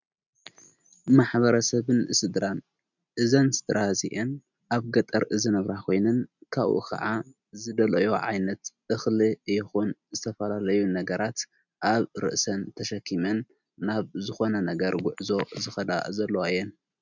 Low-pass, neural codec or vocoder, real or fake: 7.2 kHz; none; real